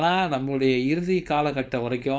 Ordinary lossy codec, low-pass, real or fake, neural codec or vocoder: none; none; fake; codec, 16 kHz, 4.8 kbps, FACodec